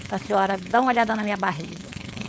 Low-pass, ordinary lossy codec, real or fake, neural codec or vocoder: none; none; fake; codec, 16 kHz, 4.8 kbps, FACodec